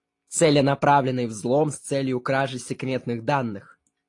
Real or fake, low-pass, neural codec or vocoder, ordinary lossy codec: real; 10.8 kHz; none; AAC, 48 kbps